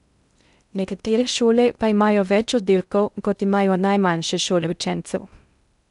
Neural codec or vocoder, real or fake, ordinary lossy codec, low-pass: codec, 16 kHz in and 24 kHz out, 0.6 kbps, FocalCodec, streaming, 2048 codes; fake; none; 10.8 kHz